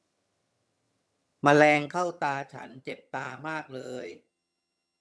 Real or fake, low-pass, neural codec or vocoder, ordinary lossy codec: fake; none; vocoder, 22.05 kHz, 80 mel bands, HiFi-GAN; none